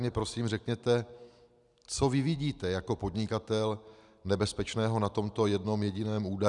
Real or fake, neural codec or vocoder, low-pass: real; none; 10.8 kHz